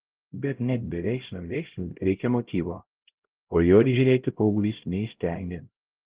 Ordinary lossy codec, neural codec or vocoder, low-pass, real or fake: Opus, 16 kbps; codec, 16 kHz, 0.5 kbps, X-Codec, HuBERT features, trained on LibriSpeech; 3.6 kHz; fake